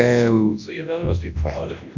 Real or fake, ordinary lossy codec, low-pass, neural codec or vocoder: fake; MP3, 64 kbps; 7.2 kHz; codec, 24 kHz, 0.9 kbps, WavTokenizer, large speech release